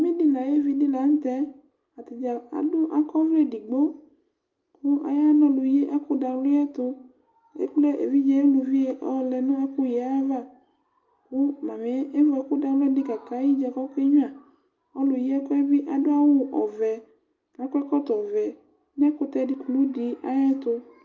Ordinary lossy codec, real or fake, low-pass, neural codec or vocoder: Opus, 24 kbps; real; 7.2 kHz; none